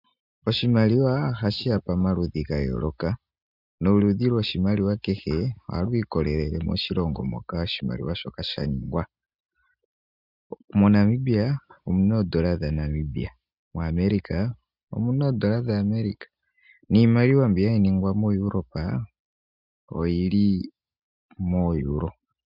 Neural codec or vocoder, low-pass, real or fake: none; 5.4 kHz; real